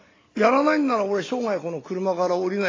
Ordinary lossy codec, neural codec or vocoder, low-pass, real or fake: AAC, 32 kbps; none; 7.2 kHz; real